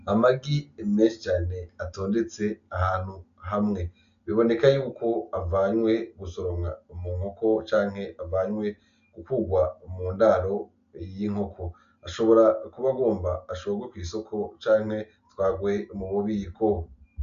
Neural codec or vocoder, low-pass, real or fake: none; 7.2 kHz; real